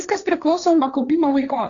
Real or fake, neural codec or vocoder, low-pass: fake; codec, 16 kHz, 1.1 kbps, Voila-Tokenizer; 7.2 kHz